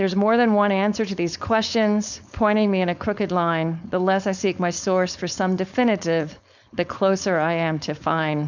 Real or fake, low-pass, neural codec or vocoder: fake; 7.2 kHz; codec, 16 kHz, 4.8 kbps, FACodec